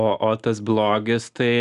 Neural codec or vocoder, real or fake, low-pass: none; real; 10.8 kHz